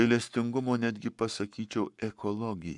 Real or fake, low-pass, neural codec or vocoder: fake; 10.8 kHz; codec, 44.1 kHz, 7.8 kbps, Pupu-Codec